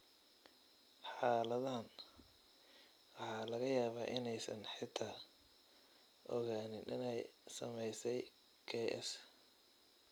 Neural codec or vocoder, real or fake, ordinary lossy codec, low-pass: none; real; none; none